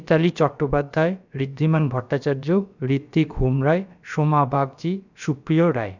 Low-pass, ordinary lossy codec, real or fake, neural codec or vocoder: 7.2 kHz; none; fake; codec, 16 kHz, about 1 kbps, DyCAST, with the encoder's durations